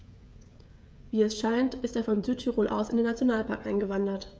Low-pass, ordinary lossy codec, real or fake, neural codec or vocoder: none; none; fake; codec, 16 kHz, 16 kbps, FreqCodec, smaller model